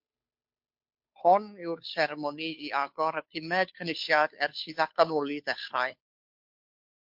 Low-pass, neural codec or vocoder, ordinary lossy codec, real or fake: 5.4 kHz; codec, 16 kHz, 2 kbps, FunCodec, trained on Chinese and English, 25 frames a second; MP3, 48 kbps; fake